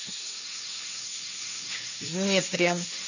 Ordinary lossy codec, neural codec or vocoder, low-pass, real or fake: none; codec, 16 kHz, 1.1 kbps, Voila-Tokenizer; 7.2 kHz; fake